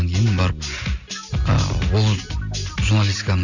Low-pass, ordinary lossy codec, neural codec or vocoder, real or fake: 7.2 kHz; none; none; real